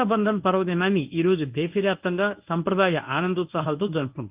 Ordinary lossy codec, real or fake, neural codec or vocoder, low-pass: Opus, 24 kbps; fake; codec, 24 kHz, 0.9 kbps, WavTokenizer, medium speech release version 1; 3.6 kHz